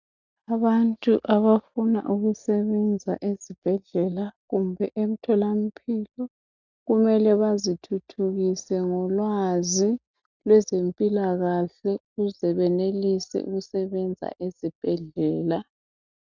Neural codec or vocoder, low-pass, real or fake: none; 7.2 kHz; real